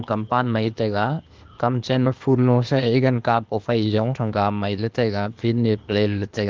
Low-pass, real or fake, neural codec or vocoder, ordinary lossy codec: 7.2 kHz; fake; codec, 16 kHz, 0.8 kbps, ZipCodec; Opus, 24 kbps